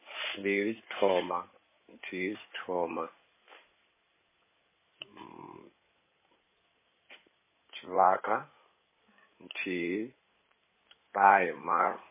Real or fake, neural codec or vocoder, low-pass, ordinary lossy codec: fake; codec, 16 kHz in and 24 kHz out, 2.2 kbps, FireRedTTS-2 codec; 3.6 kHz; MP3, 16 kbps